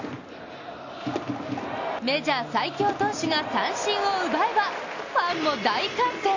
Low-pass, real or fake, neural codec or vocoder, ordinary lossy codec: 7.2 kHz; real; none; AAC, 48 kbps